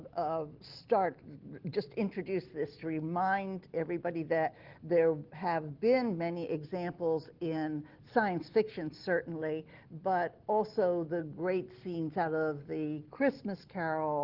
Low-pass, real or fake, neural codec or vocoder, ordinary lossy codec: 5.4 kHz; real; none; Opus, 16 kbps